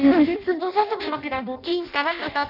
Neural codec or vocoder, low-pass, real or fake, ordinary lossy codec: codec, 16 kHz in and 24 kHz out, 0.6 kbps, FireRedTTS-2 codec; 5.4 kHz; fake; none